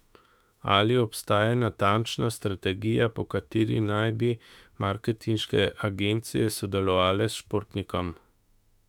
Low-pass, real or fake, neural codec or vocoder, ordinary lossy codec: 19.8 kHz; fake; autoencoder, 48 kHz, 32 numbers a frame, DAC-VAE, trained on Japanese speech; none